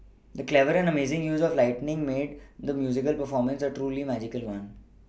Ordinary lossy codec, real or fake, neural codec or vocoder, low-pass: none; real; none; none